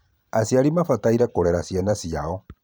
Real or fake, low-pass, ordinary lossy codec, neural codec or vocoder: fake; none; none; vocoder, 44.1 kHz, 128 mel bands every 512 samples, BigVGAN v2